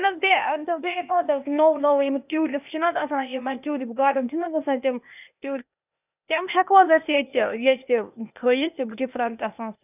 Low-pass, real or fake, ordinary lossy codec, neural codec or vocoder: 3.6 kHz; fake; none; codec, 16 kHz, 0.8 kbps, ZipCodec